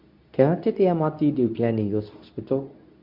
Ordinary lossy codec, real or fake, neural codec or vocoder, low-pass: none; fake; codec, 24 kHz, 0.9 kbps, WavTokenizer, medium speech release version 2; 5.4 kHz